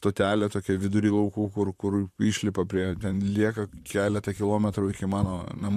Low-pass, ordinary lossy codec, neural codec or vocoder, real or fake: 14.4 kHz; MP3, 96 kbps; vocoder, 44.1 kHz, 128 mel bands, Pupu-Vocoder; fake